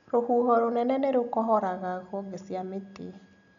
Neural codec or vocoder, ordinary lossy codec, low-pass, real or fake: none; MP3, 96 kbps; 7.2 kHz; real